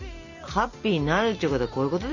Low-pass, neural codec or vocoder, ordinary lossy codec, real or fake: 7.2 kHz; none; none; real